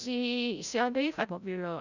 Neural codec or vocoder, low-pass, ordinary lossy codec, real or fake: codec, 16 kHz, 0.5 kbps, FreqCodec, larger model; 7.2 kHz; none; fake